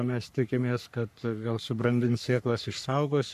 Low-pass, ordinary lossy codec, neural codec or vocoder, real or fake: 14.4 kHz; MP3, 96 kbps; codec, 44.1 kHz, 3.4 kbps, Pupu-Codec; fake